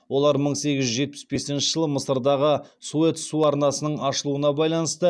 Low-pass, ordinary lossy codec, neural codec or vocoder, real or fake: none; none; none; real